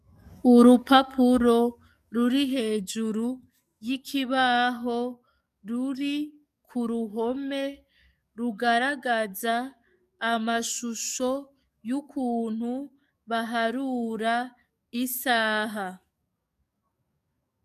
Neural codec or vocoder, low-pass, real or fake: codec, 44.1 kHz, 7.8 kbps, DAC; 14.4 kHz; fake